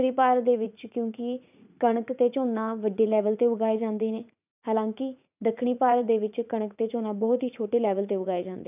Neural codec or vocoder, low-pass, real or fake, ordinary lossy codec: vocoder, 44.1 kHz, 80 mel bands, Vocos; 3.6 kHz; fake; none